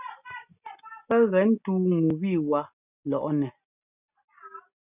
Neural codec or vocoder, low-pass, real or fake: none; 3.6 kHz; real